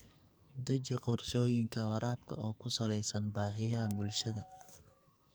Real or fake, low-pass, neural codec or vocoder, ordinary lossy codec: fake; none; codec, 44.1 kHz, 2.6 kbps, SNAC; none